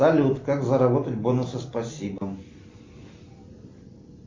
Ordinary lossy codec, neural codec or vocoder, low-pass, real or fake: MP3, 48 kbps; none; 7.2 kHz; real